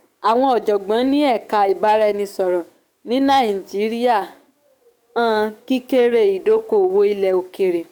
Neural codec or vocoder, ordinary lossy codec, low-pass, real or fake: codec, 44.1 kHz, 7.8 kbps, DAC; none; 19.8 kHz; fake